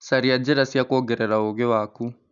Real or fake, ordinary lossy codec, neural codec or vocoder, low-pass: real; none; none; 7.2 kHz